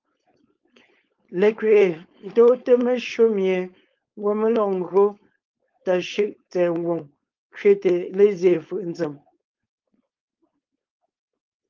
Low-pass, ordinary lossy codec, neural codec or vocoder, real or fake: 7.2 kHz; Opus, 24 kbps; codec, 16 kHz, 4.8 kbps, FACodec; fake